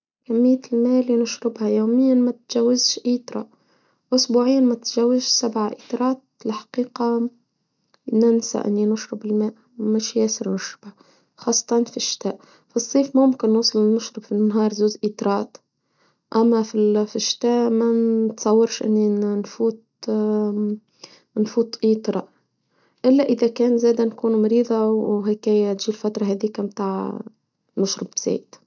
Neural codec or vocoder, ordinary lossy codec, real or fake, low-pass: none; none; real; 7.2 kHz